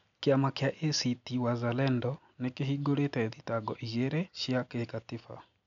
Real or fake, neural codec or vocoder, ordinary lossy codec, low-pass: real; none; MP3, 96 kbps; 7.2 kHz